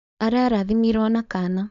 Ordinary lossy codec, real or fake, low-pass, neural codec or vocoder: none; fake; 7.2 kHz; codec, 16 kHz, 4.8 kbps, FACodec